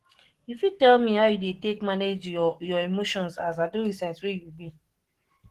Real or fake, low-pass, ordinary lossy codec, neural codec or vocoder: fake; 14.4 kHz; Opus, 16 kbps; codec, 44.1 kHz, 7.8 kbps, DAC